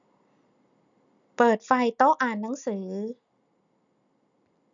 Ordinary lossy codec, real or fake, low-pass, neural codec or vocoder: none; real; 7.2 kHz; none